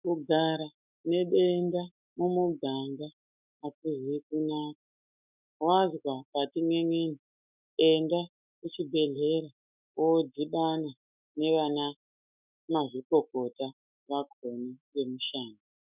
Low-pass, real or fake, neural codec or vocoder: 3.6 kHz; fake; autoencoder, 48 kHz, 128 numbers a frame, DAC-VAE, trained on Japanese speech